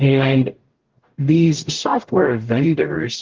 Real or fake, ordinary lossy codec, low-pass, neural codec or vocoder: fake; Opus, 16 kbps; 7.2 kHz; codec, 44.1 kHz, 0.9 kbps, DAC